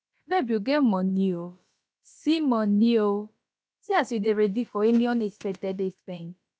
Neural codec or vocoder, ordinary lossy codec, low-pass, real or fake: codec, 16 kHz, about 1 kbps, DyCAST, with the encoder's durations; none; none; fake